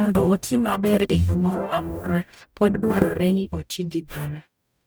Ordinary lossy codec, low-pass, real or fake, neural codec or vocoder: none; none; fake; codec, 44.1 kHz, 0.9 kbps, DAC